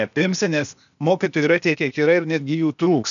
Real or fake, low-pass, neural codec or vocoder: fake; 7.2 kHz; codec, 16 kHz, 0.8 kbps, ZipCodec